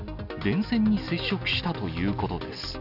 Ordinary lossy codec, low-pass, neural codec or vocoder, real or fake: none; 5.4 kHz; none; real